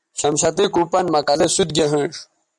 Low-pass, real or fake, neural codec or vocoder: 10.8 kHz; real; none